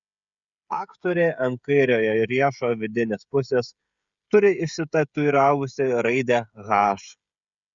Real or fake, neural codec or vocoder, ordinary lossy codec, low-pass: fake; codec, 16 kHz, 16 kbps, FreqCodec, smaller model; Opus, 64 kbps; 7.2 kHz